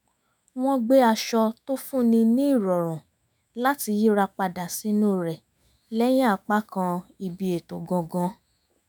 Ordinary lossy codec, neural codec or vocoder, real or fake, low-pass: none; autoencoder, 48 kHz, 128 numbers a frame, DAC-VAE, trained on Japanese speech; fake; none